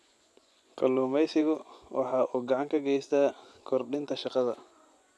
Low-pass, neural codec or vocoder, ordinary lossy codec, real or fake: none; vocoder, 24 kHz, 100 mel bands, Vocos; none; fake